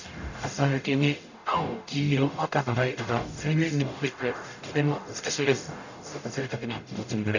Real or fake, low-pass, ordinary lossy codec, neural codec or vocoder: fake; 7.2 kHz; none; codec, 44.1 kHz, 0.9 kbps, DAC